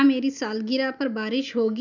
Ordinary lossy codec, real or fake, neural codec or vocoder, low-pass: none; real; none; 7.2 kHz